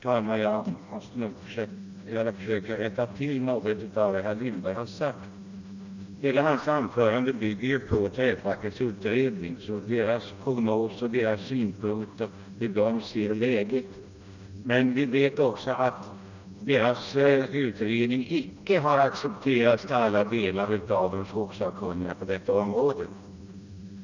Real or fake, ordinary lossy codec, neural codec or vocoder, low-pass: fake; none; codec, 16 kHz, 1 kbps, FreqCodec, smaller model; 7.2 kHz